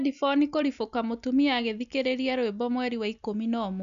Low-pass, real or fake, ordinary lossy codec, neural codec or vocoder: 7.2 kHz; real; none; none